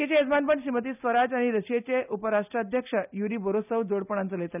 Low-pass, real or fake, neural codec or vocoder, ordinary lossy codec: 3.6 kHz; real; none; none